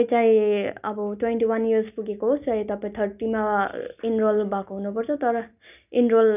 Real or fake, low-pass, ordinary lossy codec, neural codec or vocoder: real; 3.6 kHz; none; none